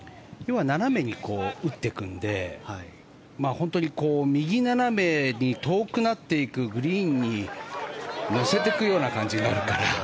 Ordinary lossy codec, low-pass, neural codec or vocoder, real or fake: none; none; none; real